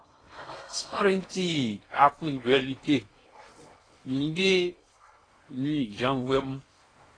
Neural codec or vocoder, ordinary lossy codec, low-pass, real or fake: codec, 16 kHz in and 24 kHz out, 0.6 kbps, FocalCodec, streaming, 4096 codes; AAC, 32 kbps; 9.9 kHz; fake